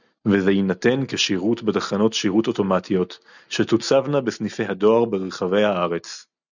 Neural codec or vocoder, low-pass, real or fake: none; 7.2 kHz; real